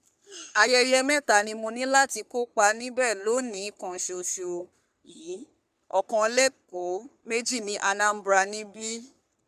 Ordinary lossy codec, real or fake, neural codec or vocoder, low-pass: none; fake; codec, 44.1 kHz, 3.4 kbps, Pupu-Codec; 14.4 kHz